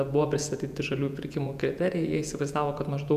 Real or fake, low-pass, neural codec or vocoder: fake; 14.4 kHz; vocoder, 48 kHz, 128 mel bands, Vocos